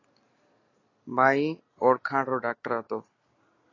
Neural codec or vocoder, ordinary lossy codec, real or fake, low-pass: none; AAC, 32 kbps; real; 7.2 kHz